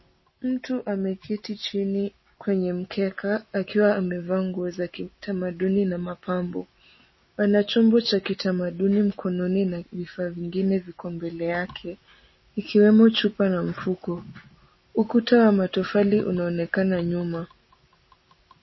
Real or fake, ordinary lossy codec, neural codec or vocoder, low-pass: real; MP3, 24 kbps; none; 7.2 kHz